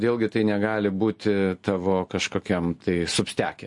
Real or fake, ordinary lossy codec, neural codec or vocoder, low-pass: real; MP3, 48 kbps; none; 10.8 kHz